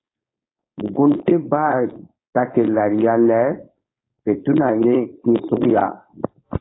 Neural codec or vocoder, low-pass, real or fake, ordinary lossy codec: codec, 16 kHz, 4.8 kbps, FACodec; 7.2 kHz; fake; AAC, 16 kbps